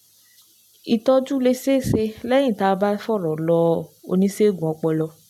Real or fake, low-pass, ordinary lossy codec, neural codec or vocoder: real; 19.8 kHz; none; none